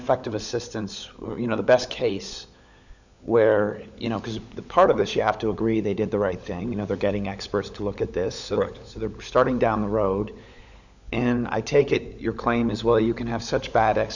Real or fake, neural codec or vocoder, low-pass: fake; codec, 16 kHz, 16 kbps, FunCodec, trained on LibriTTS, 50 frames a second; 7.2 kHz